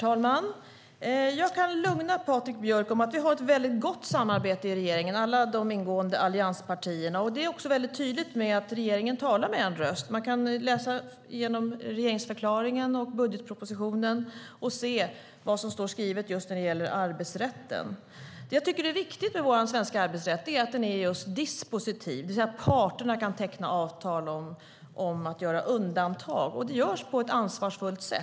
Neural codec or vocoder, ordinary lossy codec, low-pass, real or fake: none; none; none; real